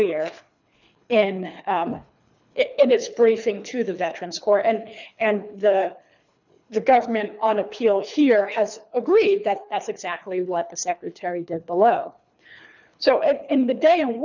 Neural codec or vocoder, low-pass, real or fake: codec, 24 kHz, 3 kbps, HILCodec; 7.2 kHz; fake